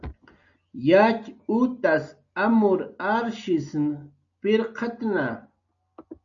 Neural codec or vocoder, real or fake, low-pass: none; real; 7.2 kHz